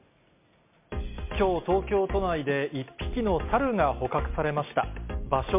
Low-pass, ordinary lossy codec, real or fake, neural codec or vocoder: 3.6 kHz; MP3, 32 kbps; real; none